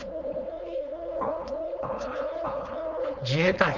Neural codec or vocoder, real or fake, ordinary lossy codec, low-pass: codec, 16 kHz, 4.8 kbps, FACodec; fake; none; 7.2 kHz